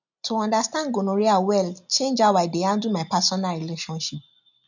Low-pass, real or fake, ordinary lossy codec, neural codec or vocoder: 7.2 kHz; real; none; none